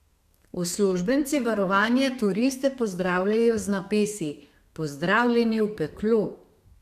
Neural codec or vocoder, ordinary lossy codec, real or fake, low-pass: codec, 32 kHz, 1.9 kbps, SNAC; none; fake; 14.4 kHz